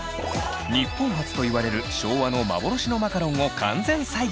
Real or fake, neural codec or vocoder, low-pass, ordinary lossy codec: real; none; none; none